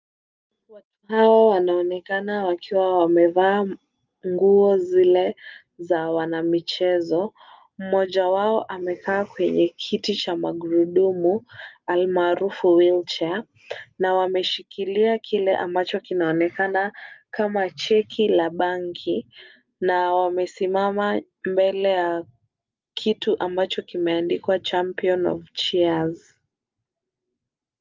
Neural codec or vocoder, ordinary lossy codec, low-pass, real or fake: none; Opus, 24 kbps; 7.2 kHz; real